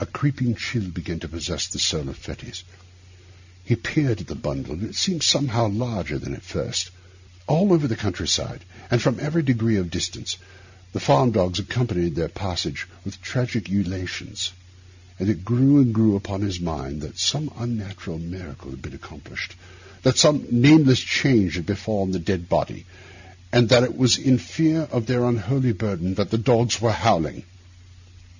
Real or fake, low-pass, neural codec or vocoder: real; 7.2 kHz; none